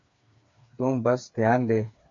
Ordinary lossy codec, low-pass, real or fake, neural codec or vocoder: MP3, 64 kbps; 7.2 kHz; fake; codec, 16 kHz, 4 kbps, FreqCodec, smaller model